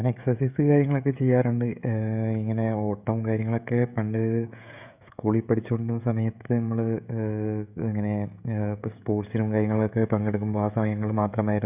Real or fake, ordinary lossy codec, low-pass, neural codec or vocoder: fake; none; 3.6 kHz; codec, 16 kHz, 16 kbps, FreqCodec, smaller model